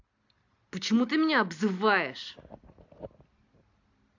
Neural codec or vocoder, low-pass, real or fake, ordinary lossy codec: none; 7.2 kHz; real; AAC, 48 kbps